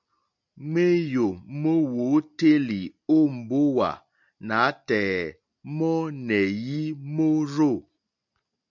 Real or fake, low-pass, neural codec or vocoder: real; 7.2 kHz; none